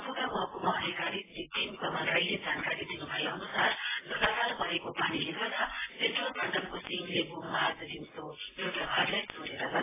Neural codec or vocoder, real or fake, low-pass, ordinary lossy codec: none; real; 3.6 kHz; AAC, 16 kbps